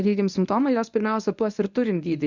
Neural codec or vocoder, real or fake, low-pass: codec, 24 kHz, 0.9 kbps, WavTokenizer, medium speech release version 1; fake; 7.2 kHz